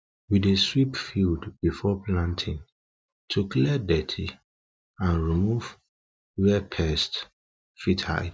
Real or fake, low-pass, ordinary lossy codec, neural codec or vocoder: real; none; none; none